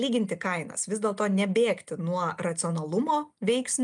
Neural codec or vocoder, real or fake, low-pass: none; real; 10.8 kHz